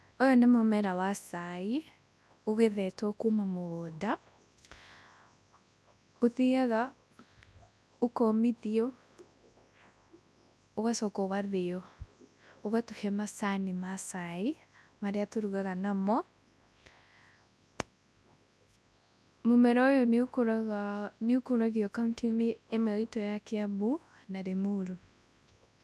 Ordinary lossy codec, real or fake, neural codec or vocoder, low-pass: none; fake; codec, 24 kHz, 0.9 kbps, WavTokenizer, large speech release; none